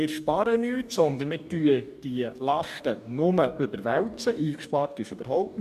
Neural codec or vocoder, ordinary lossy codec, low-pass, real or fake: codec, 44.1 kHz, 2.6 kbps, DAC; none; 14.4 kHz; fake